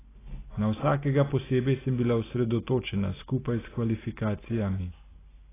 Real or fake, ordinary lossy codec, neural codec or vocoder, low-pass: real; AAC, 16 kbps; none; 3.6 kHz